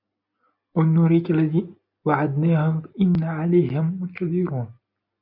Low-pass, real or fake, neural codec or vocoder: 5.4 kHz; real; none